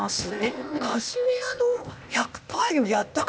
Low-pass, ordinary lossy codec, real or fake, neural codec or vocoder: none; none; fake; codec, 16 kHz, 0.8 kbps, ZipCodec